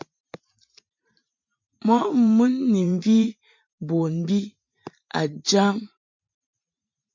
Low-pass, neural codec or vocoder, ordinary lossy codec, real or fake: 7.2 kHz; vocoder, 44.1 kHz, 128 mel bands every 512 samples, BigVGAN v2; MP3, 48 kbps; fake